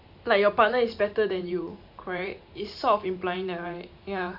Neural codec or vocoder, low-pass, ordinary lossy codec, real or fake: vocoder, 44.1 kHz, 128 mel bands every 512 samples, BigVGAN v2; 5.4 kHz; AAC, 48 kbps; fake